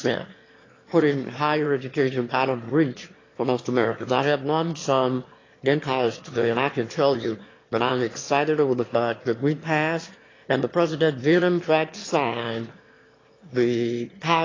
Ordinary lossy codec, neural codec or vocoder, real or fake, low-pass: AAC, 32 kbps; autoencoder, 22.05 kHz, a latent of 192 numbers a frame, VITS, trained on one speaker; fake; 7.2 kHz